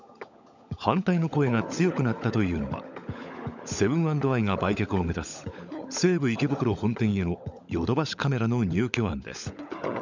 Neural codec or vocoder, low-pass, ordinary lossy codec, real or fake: codec, 16 kHz, 16 kbps, FunCodec, trained on LibriTTS, 50 frames a second; 7.2 kHz; none; fake